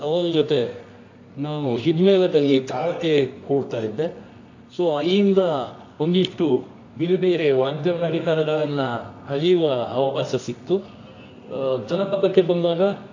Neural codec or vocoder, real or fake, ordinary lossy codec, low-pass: codec, 24 kHz, 0.9 kbps, WavTokenizer, medium music audio release; fake; AAC, 48 kbps; 7.2 kHz